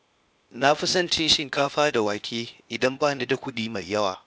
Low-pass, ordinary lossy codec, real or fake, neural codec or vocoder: none; none; fake; codec, 16 kHz, 0.8 kbps, ZipCodec